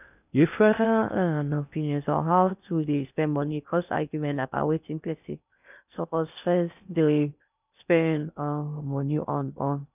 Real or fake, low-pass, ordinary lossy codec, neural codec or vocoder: fake; 3.6 kHz; none; codec, 16 kHz in and 24 kHz out, 0.6 kbps, FocalCodec, streaming, 2048 codes